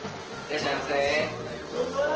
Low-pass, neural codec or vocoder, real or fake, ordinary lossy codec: 7.2 kHz; none; real; Opus, 16 kbps